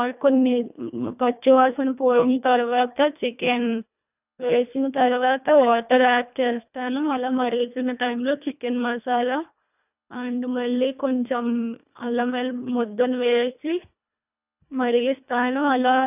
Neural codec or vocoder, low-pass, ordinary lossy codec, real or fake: codec, 24 kHz, 1.5 kbps, HILCodec; 3.6 kHz; none; fake